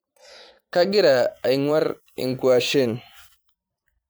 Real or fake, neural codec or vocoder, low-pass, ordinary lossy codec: fake; vocoder, 44.1 kHz, 128 mel bands every 512 samples, BigVGAN v2; none; none